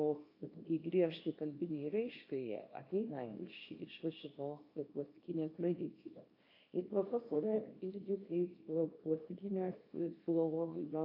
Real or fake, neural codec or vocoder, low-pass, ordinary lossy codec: fake; codec, 24 kHz, 0.9 kbps, WavTokenizer, small release; 5.4 kHz; AAC, 32 kbps